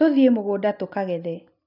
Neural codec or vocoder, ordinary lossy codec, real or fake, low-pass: none; none; real; 5.4 kHz